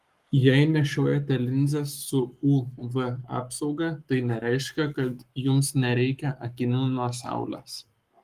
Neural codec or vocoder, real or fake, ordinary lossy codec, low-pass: codec, 44.1 kHz, 7.8 kbps, Pupu-Codec; fake; Opus, 32 kbps; 14.4 kHz